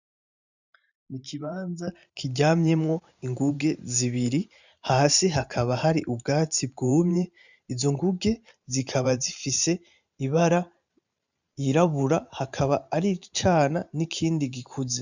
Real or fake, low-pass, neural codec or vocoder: fake; 7.2 kHz; vocoder, 44.1 kHz, 128 mel bands every 512 samples, BigVGAN v2